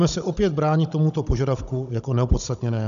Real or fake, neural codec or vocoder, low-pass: fake; codec, 16 kHz, 16 kbps, FunCodec, trained on Chinese and English, 50 frames a second; 7.2 kHz